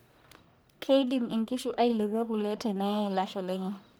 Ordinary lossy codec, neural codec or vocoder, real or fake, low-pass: none; codec, 44.1 kHz, 1.7 kbps, Pupu-Codec; fake; none